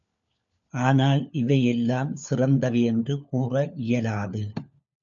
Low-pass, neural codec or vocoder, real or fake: 7.2 kHz; codec, 16 kHz, 4 kbps, FunCodec, trained on LibriTTS, 50 frames a second; fake